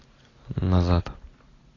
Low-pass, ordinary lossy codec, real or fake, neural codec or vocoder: 7.2 kHz; AAC, 32 kbps; real; none